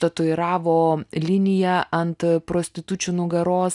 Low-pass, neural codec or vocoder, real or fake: 10.8 kHz; none; real